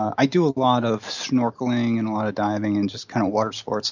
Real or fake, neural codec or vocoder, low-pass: real; none; 7.2 kHz